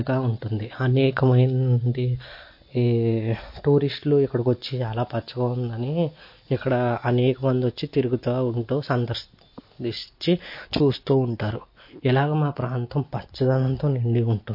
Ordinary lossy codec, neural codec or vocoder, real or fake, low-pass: MP3, 32 kbps; none; real; 5.4 kHz